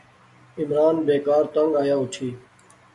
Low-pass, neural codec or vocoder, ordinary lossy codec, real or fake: 10.8 kHz; none; MP3, 96 kbps; real